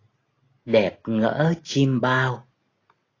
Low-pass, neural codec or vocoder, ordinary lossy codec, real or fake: 7.2 kHz; none; AAC, 32 kbps; real